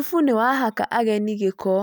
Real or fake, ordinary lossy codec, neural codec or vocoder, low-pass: real; none; none; none